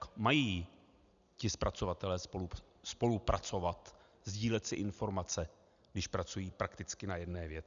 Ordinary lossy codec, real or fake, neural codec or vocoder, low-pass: MP3, 96 kbps; real; none; 7.2 kHz